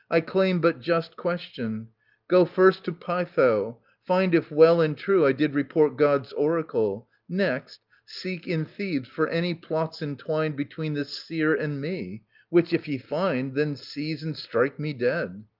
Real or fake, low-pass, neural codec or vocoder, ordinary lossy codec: real; 5.4 kHz; none; Opus, 32 kbps